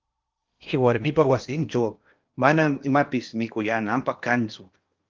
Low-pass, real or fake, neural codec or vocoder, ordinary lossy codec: 7.2 kHz; fake; codec, 16 kHz in and 24 kHz out, 0.8 kbps, FocalCodec, streaming, 65536 codes; Opus, 24 kbps